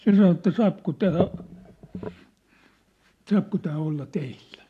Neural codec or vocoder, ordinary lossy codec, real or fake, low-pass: vocoder, 44.1 kHz, 128 mel bands every 512 samples, BigVGAN v2; AAC, 64 kbps; fake; 14.4 kHz